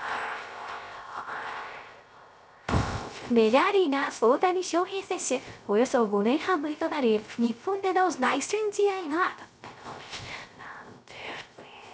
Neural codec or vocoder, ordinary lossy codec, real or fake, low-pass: codec, 16 kHz, 0.3 kbps, FocalCodec; none; fake; none